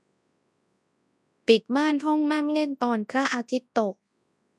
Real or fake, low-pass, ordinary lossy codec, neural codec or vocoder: fake; none; none; codec, 24 kHz, 0.9 kbps, WavTokenizer, large speech release